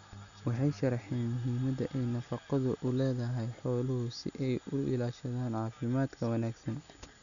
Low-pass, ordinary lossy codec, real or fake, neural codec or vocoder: 7.2 kHz; none; real; none